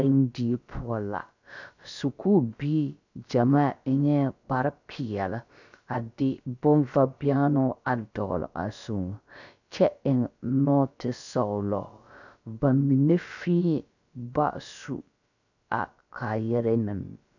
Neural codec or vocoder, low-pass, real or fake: codec, 16 kHz, about 1 kbps, DyCAST, with the encoder's durations; 7.2 kHz; fake